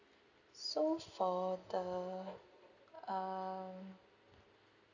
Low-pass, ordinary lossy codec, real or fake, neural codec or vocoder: 7.2 kHz; none; real; none